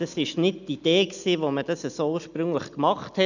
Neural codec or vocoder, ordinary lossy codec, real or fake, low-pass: none; none; real; 7.2 kHz